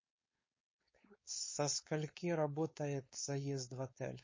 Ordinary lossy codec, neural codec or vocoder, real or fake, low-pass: MP3, 32 kbps; codec, 16 kHz, 4.8 kbps, FACodec; fake; 7.2 kHz